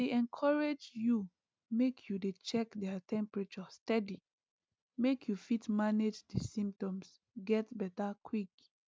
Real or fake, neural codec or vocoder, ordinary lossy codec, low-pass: real; none; none; none